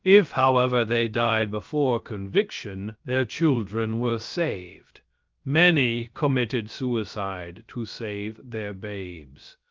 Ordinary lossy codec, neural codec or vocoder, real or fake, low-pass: Opus, 24 kbps; codec, 16 kHz, about 1 kbps, DyCAST, with the encoder's durations; fake; 7.2 kHz